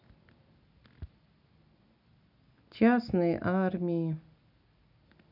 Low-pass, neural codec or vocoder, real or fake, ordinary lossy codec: 5.4 kHz; none; real; none